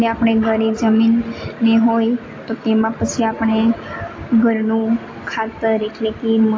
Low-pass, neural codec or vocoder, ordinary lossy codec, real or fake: 7.2 kHz; none; AAC, 32 kbps; real